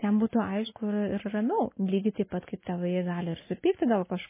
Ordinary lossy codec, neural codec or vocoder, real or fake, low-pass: MP3, 16 kbps; none; real; 3.6 kHz